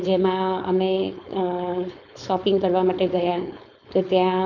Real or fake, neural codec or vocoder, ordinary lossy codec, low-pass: fake; codec, 16 kHz, 4.8 kbps, FACodec; none; 7.2 kHz